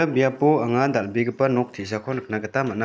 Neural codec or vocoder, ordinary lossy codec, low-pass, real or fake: none; none; none; real